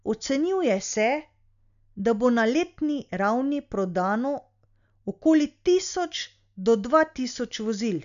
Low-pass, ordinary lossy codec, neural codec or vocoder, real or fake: 7.2 kHz; none; none; real